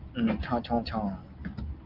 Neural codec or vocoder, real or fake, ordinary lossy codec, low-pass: none; real; Opus, 32 kbps; 5.4 kHz